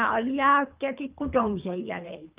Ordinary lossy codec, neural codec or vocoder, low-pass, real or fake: Opus, 24 kbps; codec, 24 kHz, 3 kbps, HILCodec; 3.6 kHz; fake